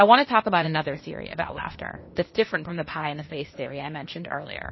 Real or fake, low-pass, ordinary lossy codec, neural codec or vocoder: fake; 7.2 kHz; MP3, 24 kbps; codec, 16 kHz, 0.8 kbps, ZipCodec